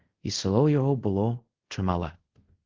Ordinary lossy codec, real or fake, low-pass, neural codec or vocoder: Opus, 16 kbps; fake; 7.2 kHz; codec, 24 kHz, 0.5 kbps, DualCodec